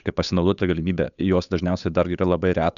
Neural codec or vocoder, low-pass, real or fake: codec, 16 kHz, 8 kbps, FunCodec, trained on Chinese and English, 25 frames a second; 7.2 kHz; fake